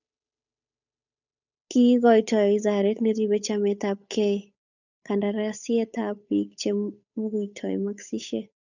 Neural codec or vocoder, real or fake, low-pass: codec, 16 kHz, 8 kbps, FunCodec, trained on Chinese and English, 25 frames a second; fake; 7.2 kHz